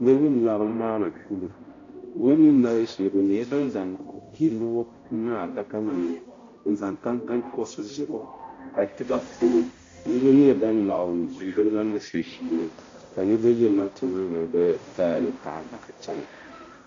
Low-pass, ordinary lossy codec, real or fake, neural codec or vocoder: 7.2 kHz; AAC, 32 kbps; fake; codec, 16 kHz, 0.5 kbps, X-Codec, HuBERT features, trained on balanced general audio